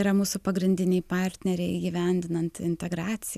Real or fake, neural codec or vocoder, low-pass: real; none; 14.4 kHz